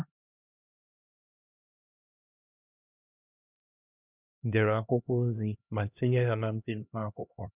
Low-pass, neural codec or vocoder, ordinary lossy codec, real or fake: 3.6 kHz; codec, 16 kHz, 1 kbps, X-Codec, HuBERT features, trained on LibriSpeech; none; fake